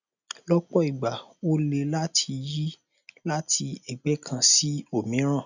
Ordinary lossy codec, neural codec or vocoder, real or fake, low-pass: none; none; real; 7.2 kHz